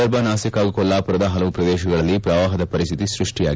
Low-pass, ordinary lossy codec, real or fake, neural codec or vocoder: none; none; real; none